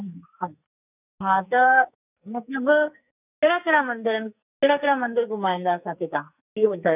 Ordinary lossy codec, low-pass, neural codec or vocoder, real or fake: none; 3.6 kHz; codec, 44.1 kHz, 2.6 kbps, SNAC; fake